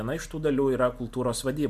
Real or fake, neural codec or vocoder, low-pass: real; none; 14.4 kHz